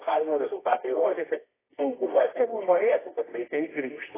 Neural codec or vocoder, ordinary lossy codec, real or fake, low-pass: codec, 24 kHz, 0.9 kbps, WavTokenizer, medium music audio release; AAC, 16 kbps; fake; 3.6 kHz